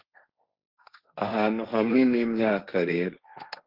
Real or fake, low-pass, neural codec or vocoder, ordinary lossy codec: fake; 5.4 kHz; codec, 16 kHz, 1.1 kbps, Voila-Tokenizer; Opus, 32 kbps